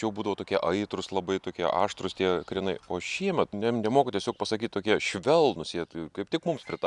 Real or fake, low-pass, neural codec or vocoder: real; 10.8 kHz; none